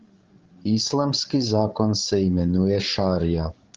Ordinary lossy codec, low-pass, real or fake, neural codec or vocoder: Opus, 16 kbps; 7.2 kHz; fake; codec, 16 kHz, 16 kbps, FreqCodec, larger model